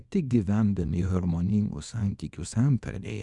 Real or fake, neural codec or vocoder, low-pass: fake; codec, 24 kHz, 0.9 kbps, WavTokenizer, small release; 10.8 kHz